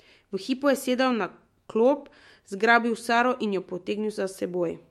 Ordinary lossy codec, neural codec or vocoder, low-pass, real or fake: MP3, 64 kbps; autoencoder, 48 kHz, 128 numbers a frame, DAC-VAE, trained on Japanese speech; 19.8 kHz; fake